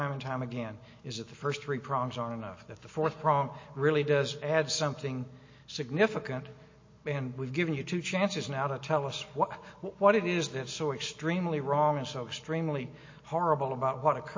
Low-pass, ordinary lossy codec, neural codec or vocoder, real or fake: 7.2 kHz; MP3, 32 kbps; none; real